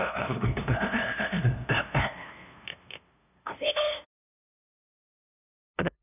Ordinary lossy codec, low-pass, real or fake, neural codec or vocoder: none; 3.6 kHz; fake; codec, 16 kHz, 0.5 kbps, FunCodec, trained on LibriTTS, 25 frames a second